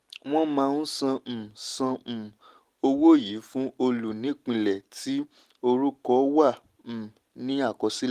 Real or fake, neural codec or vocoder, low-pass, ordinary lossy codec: real; none; 14.4 kHz; Opus, 24 kbps